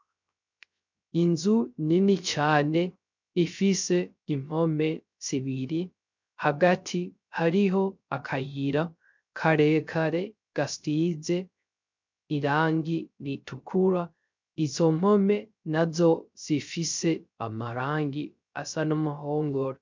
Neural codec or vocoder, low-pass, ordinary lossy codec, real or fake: codec, 16 kHz, 0.3 kbps, FocalCodec; 7.2 kHz; MP3, 64 kbps; fake